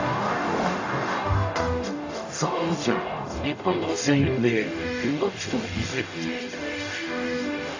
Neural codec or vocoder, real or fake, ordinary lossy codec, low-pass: codec, 44.1 kHz, 0.9 kbps, DAC; fake; AAC, 48 kbps; 7.2 kHz